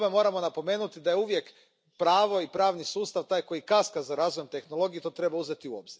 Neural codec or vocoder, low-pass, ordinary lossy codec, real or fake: none; none; none; real